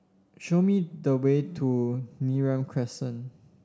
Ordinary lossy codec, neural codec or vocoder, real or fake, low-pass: none; none; real; none